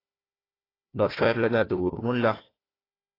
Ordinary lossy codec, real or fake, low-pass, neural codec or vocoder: AAC, 24 kbps; fake; 5.4 kHz; codec, 16 kHz, 1 kbps, FunCodec, trained on Chinese and English, 50 frames a second